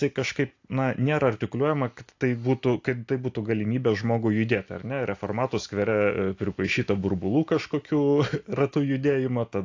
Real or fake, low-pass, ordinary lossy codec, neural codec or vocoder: real; 7.2 kHz; AAC, 48 kbps; none